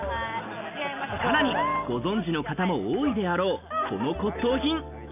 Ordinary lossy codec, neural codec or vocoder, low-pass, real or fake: none; none; 3.6 kHz; real